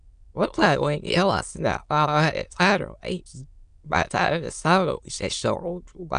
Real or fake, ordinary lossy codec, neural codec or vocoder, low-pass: fake; MP3, 96 kbps; autoencoder, 22.05 kHz, a latent of 192 numbers a frame, VITS, trained on many speakers; 9.9 kHz